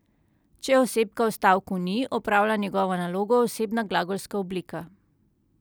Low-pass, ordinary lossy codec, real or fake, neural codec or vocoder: none; none; real; none